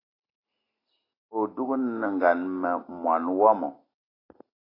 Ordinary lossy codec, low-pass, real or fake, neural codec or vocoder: AAC, 24 kbps; 5.4 kHz; real; none